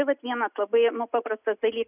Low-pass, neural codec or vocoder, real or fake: 3.6 kHz; none; real